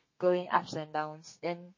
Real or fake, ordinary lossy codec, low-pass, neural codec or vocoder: fake; MP3, 32 kbps; 7.2 kHz; codec, 32 kHz, 1.9 kbps, SNAC